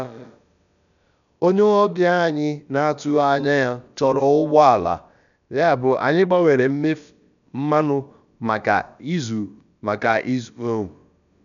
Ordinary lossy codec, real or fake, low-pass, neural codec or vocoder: none; fake; 7.2 kHz; codec, 16 kHz, about 1 kbps, DyCAST, with the encoder's durations